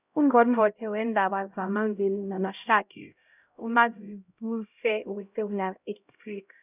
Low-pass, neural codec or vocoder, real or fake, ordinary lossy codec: 3.6 kHz; codec, 16 kHz, 0.5 kbps, X-Codec, HuBERT features, trained on LibriSpeech; fake; none